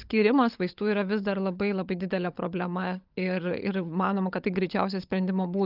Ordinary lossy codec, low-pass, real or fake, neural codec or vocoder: Opus, 24 kbps; 5.4 kHz; fake; codec, 16 kHz, 16 kbps, FunCodec, trained on LibriTTS, 50 frames a second